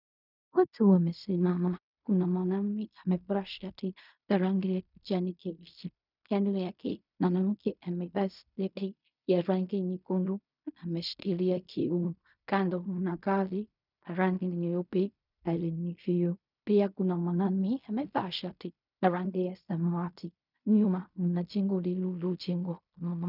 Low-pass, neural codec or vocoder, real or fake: 5.4 kHz; codec, 16 kHz in and 24 kHz out, 0.4 kbps, LongCat-Audio-Codec, fine tuned four codebook decoder; fake